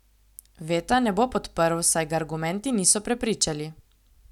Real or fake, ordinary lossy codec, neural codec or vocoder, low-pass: real; none; none; 19.8 kHz